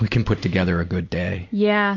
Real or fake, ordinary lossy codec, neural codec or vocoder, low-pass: real; MP3, 64 kbps; none; 7.2 kHz